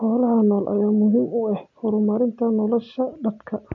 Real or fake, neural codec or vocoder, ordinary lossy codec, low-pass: real; none; MP3, 48 kbps; 7.2 kHz